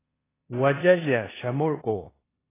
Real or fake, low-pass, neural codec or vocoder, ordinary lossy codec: fake; 3.6 kHz; codec, 16 kHz in and 24 kHz out, 0.9 kbps, LongCat-Audio-Codec, four codebook decoder; AAC, 16 kbps